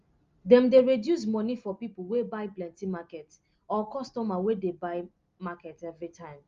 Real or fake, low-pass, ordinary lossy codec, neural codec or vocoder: real; 7.2 kHz; Opus, 32 kbps; none